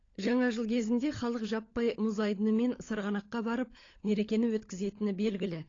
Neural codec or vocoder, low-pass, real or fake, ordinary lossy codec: codec, 16 kHz, 16 kbps, FunCodec, trained on LibriTTS, 50 frames a second; 7.2 kHz; fake; AAC, 32 kbps